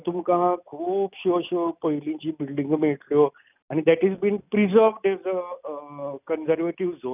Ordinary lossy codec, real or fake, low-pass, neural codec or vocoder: none; real; 3.6 kHz; none